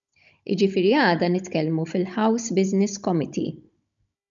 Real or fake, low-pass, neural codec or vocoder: fake; 7.2 kHz; codec, 16 kHz, 16 kbps, FunCodec, trained on Chinese and English, 50 frames a second